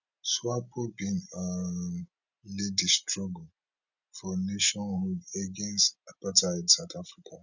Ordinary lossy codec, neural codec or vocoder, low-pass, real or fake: none; none; 7.2 kHz; real